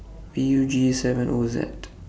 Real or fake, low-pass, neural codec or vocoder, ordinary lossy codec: real; none; none; none